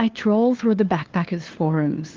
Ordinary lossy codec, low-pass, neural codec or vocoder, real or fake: Opus, 16 kbps; 7.2 kHz; codec, 16 kHz, 2 kbps, FunCodec, trained on LibriTTS, 25 frames a second; fake